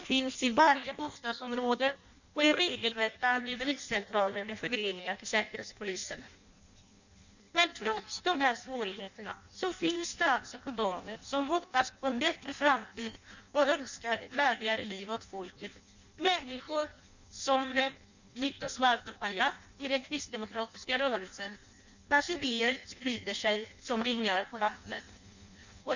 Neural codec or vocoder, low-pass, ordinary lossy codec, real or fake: codec, 16 kHz in and 24 kHz out, 0.6 kbps, FireRedTTS-2 codec; 7.2 kHz; none; fake